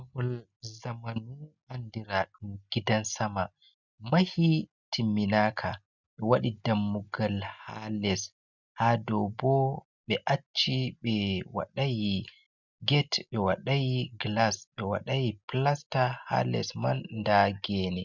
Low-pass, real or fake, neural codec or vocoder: 7.2 kHz; real; none